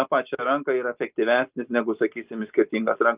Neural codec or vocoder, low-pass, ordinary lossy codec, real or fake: none; 3.6 kHz; Opus, 24 kbps; real